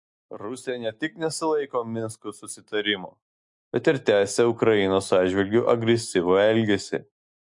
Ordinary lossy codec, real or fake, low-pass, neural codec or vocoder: MP3, 64 kbps; real; 10.8 kHz; none